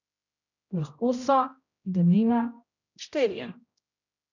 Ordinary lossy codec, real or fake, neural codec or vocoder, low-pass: none; fake; codec, 16 kHz, 0.5 kbps, X-Codec, HuBERT features, trained on general audio; 7.2 kHz